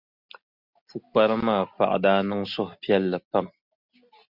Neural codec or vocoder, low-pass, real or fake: none; 5.4 kHz; real